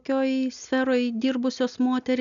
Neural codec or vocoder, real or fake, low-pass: none; real; 7.2 kHz